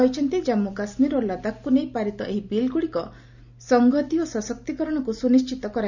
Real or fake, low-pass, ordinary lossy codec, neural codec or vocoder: real; 7.2 kHz; none; none